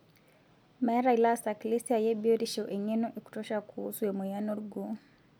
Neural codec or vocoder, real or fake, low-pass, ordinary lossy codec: none; real; none; none